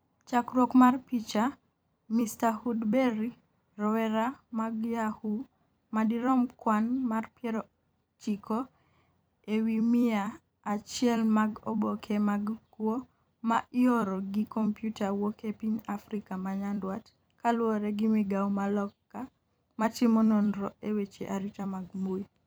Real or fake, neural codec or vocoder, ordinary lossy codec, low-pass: fake; vocoder, 44.1 kHz, 128 mel bands every 256 samples, BigVGAN v2; none; none